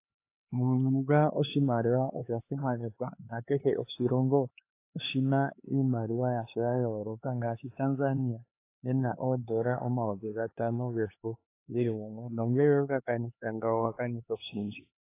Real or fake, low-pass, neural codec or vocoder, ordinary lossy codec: fake; 3.6 kHz; codec, 16 kHz, 4 kbps, X-Codec, HuBERT features, trained on LibriSpeech; AAC, 24 kbps